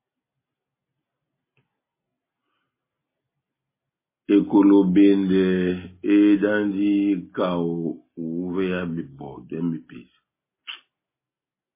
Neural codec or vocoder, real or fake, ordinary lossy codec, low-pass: none; real; MP3, 16 kbps; 3.6 kHz